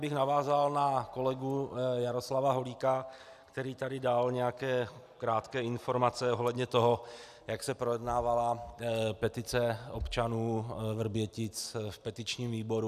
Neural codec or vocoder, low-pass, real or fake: none; 14.4 kHz; real